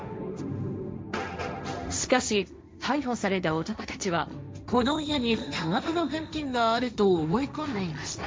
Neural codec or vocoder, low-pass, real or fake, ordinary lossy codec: codec, 16 kHz, 1.1 kbps, Voila-Tokenizer; none; fake; none